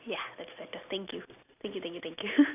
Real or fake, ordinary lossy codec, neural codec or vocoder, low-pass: real; none; none; 3.6 kHz